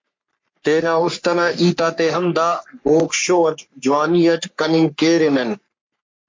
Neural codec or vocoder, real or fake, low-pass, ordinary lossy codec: codec, 44.1 kHz, 3.4 kbps, Pupu-Codec; fake; 7.2 kHz; MP3, 48 kbps